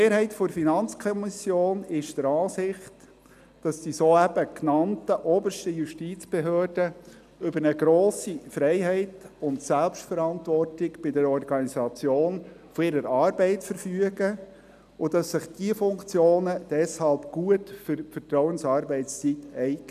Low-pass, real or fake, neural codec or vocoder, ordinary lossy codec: 14.4 kHz; real; none; none